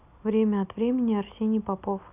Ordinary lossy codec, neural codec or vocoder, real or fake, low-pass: none; none; real; 3.6 kHz